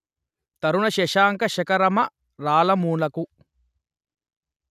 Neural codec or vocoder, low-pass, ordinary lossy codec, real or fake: none; 14.4 kHz; none; real